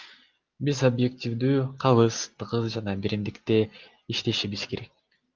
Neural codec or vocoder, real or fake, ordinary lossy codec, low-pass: none; real; Opus, 24 kbps; 7.2 kHz